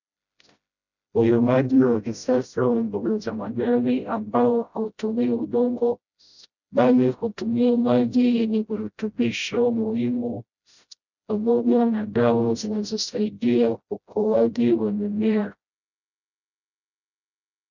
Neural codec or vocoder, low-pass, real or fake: codec, 16 kHz, 0.5 kbps, FreqCodec, smaller model; 7.2 kHz; fake